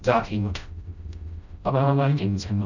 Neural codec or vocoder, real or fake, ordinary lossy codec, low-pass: codec, 16 kHz, 0.5 kbps, FreqCodec, smaller model; fake; none; 7.2 kHz